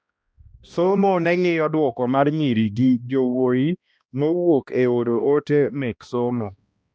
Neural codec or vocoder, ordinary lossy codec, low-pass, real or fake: codec, 16 kHz, 1 kbps, X-Codec, HuBERT features, trained on balanced general audio; none; none; fake